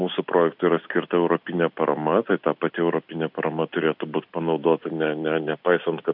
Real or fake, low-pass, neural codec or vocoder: real; 5.4 kHz; none